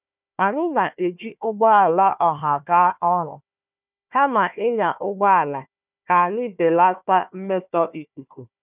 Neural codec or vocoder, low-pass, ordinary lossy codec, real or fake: codec, 16 kHz, 1 kbps, FunCodec, trained on Chinese and English, 50 frames a second; 3.6 kHz; none; fake